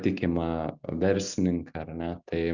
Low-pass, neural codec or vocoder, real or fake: 7.2 kHz; none; real